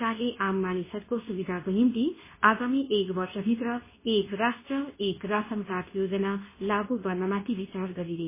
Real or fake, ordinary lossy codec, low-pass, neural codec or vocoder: fake; MP3, 16 kbps; 3.6 kHz; codec, 24 kHz, 0.9 kbps, WavTokenizer, medium speech release version 2